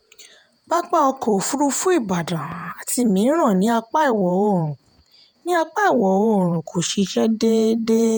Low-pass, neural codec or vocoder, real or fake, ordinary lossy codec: none; vocoder, 48 kHz, 128 mel bands, Vocos; fake; none